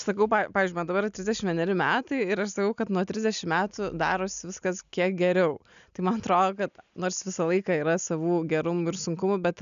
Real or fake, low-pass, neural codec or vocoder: real; 7.2 kHz; none